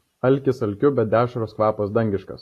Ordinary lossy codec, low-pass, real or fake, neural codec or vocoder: AAC, 48 kbps; 14.4 kHz; real; none